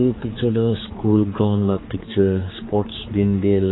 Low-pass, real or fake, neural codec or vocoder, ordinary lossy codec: 7.2 kHz; fake; codec, 16 kHz, 4 kbps, X-Codec, HuBERT features, trained on general audio; AAC, 16 kbps